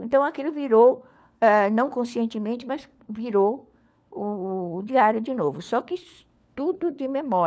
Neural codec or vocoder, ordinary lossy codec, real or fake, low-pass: codec, 16 kHz, 4 kbps, FunCodec, trained on LibriTTS, 50 frames a second; none; fake; none